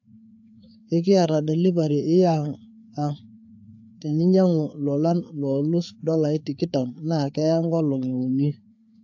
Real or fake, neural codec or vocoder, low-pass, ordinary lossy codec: fake; codec, 16 kHz, 4 kbps, FreqCodec, larger model; 7.2 kHz; AAC, 48 kbps